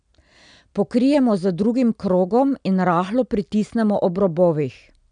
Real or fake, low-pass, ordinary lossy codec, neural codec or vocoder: real; 9.9 kHz; none; none